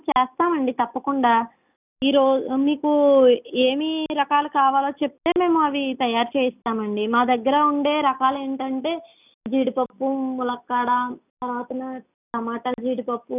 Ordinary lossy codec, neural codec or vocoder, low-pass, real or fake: none; none; 3.6 kHz; real